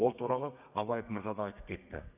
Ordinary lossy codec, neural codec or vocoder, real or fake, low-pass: none; codec, 32 kHz, 1.9 kbps, SNAC; fake; 3.6 kHz